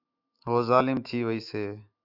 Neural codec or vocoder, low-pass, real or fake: autoencoder, 48 kHz, 128 numbers a frame, DAC-VAE, trained on Japanese speech; 5.4 kHz; fake